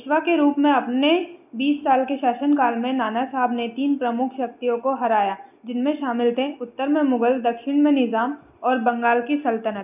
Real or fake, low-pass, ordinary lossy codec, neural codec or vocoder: real; 3.6 kHz; none; none